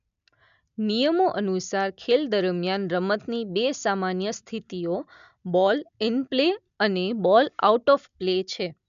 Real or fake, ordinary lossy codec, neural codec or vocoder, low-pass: real; none; none; 7.2 kHz